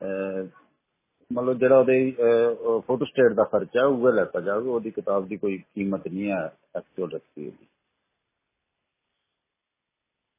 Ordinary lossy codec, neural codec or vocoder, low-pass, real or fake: MP3, 16 kbps; none; 3.6 kHz; real